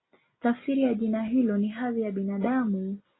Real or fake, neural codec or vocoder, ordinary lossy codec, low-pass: real; none; AAC, 16 kbps; 7.2 kHz